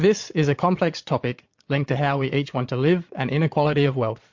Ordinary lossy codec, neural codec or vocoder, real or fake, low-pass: MP3, 48 kbps; vocoder, 22.05 kHz, 80 mel bands, Vocos; fake; 7.2 kHz